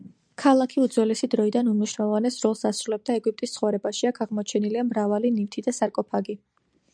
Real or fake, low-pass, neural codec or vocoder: real; 9.9 kHz; none